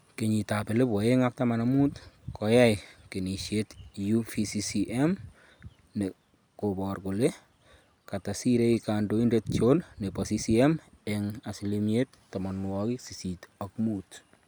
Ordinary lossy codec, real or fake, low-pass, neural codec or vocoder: none; real; none; none